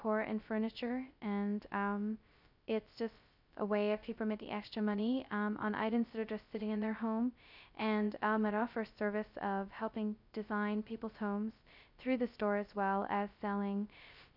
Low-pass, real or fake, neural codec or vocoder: 5.4 kHz; fake; codec, 16 kHz, 0.2 kbps, FocalCodec